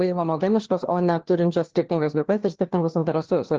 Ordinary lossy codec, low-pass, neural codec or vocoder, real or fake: Opus, 16 kbps; 7.2 kHz; codec, 16 kHz, 1 kbps, FunCodec, trained on LibriTTS, 50 frames a second; fake